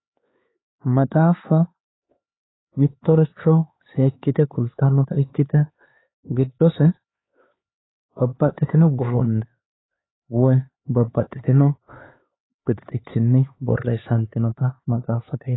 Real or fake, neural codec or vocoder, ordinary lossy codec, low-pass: fake; codec, 16 kHz, 4 kbps, X-Codec, HuBERT features, trained on LibriSpeech; AAC, 16 kbps; 7.2 kHz